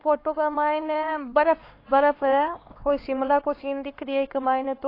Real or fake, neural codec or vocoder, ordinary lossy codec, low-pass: fake; codec, 16 kHz, 4 kbps, X-Codec, HuBERT features, trained on LibriSpeech; AAC, 24 kbps; 5.4 kHz